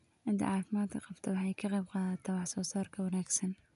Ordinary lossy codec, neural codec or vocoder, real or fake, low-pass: none; none; real; 10.8 kHz